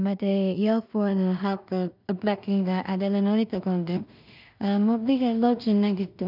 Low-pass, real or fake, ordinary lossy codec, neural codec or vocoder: 5.4 kHz; fake; none; codec, 16 kHz in and 24 kHz out, 0.4 kbps, LongCat-Audio-Codec, two codebook decoder